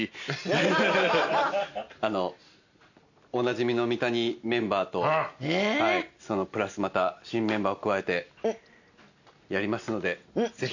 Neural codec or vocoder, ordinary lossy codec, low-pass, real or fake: none; none; 7.2 kHz; real